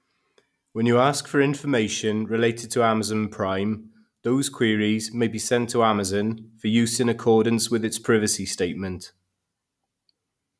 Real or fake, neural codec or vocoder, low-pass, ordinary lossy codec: real; none; none; none